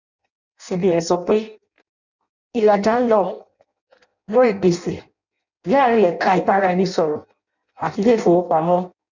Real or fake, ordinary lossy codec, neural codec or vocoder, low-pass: fake; none; codec, 16 kHz in and 24 kHz out, 0.6 kbps, FireRedTTS-2 codec; 7.2 kHz